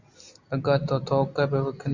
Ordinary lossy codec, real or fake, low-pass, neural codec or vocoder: Opus, 64 kbps; real; 7.2 kHz; none